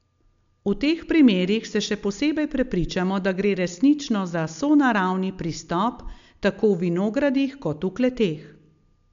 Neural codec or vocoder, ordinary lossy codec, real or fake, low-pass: none; MP3, 64 kbps; real; 7.2 kHz